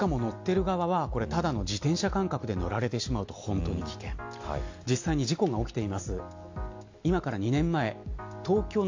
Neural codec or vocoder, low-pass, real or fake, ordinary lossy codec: none; 7.2 kHz; real; AAC, 48 kbps